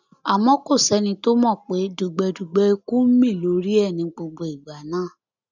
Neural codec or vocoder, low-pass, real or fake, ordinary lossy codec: none; 7.2 kHz; real; none